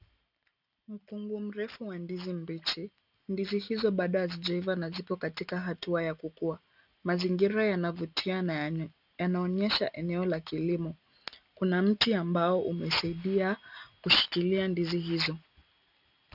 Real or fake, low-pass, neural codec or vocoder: real; 5.4 kHz; none